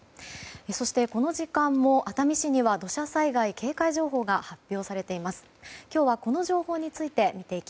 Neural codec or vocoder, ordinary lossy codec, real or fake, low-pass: none; none; real; none